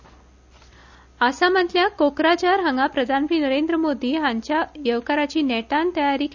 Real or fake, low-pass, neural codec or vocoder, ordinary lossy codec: real; 7.2 kHz; none; none